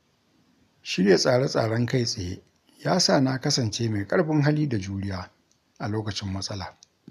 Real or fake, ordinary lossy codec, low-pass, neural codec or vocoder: real; none; 14.4 kHz; none